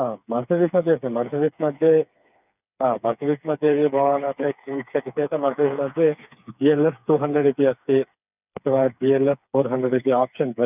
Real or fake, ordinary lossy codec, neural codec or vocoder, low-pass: fake; none; codec, 16 kHz, 4 kbps, FreqCodec, smaller model; 3.6 kHz